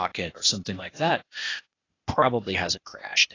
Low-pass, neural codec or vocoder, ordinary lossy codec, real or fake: 7.2 kHz; codec, 16 kHz, 0.8 kbps, ZipCodec; AAC, 32 kbps; fake